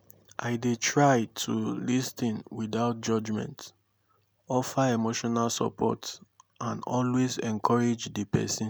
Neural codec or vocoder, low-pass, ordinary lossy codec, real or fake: none; none; none; real